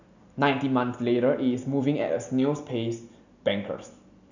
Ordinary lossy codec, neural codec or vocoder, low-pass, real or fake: none; none; 7.2 kHz; real